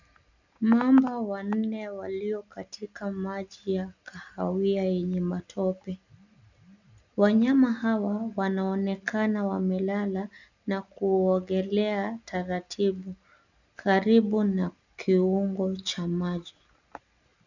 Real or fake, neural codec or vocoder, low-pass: real; none; 7.2 kHz